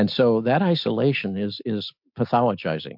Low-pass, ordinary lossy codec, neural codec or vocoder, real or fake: 5.4 kHz; MP3, 48 kbps; none; real